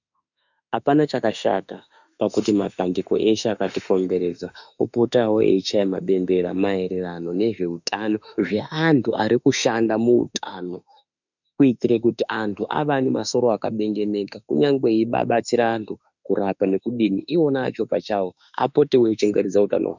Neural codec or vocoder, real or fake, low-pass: autoencoder, 48 kHz, 32 numbers a frame, DAC-VAE, trained on Japanese speech; fake; 7.2 kHz